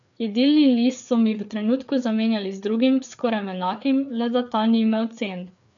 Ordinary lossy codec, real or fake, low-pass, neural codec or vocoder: none; fake; 7.2 kHz; codec, 16 kHz, 4 kbps, FreqCodec, larger model